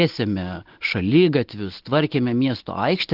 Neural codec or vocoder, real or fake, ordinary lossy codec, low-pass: none; real; Opus, 16 kbps; 5.4 kHz